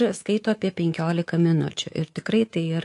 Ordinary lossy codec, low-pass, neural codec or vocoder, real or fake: AAC, 48 kbps; 10.8 kHz; codec, 24 kHz, 3.1 kbps, DualCodec; fake